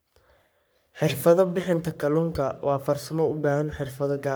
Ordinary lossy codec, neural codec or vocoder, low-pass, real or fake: none; codec, 44.1 kHz, 3.4 kbps, Pupu-Codec; none; fake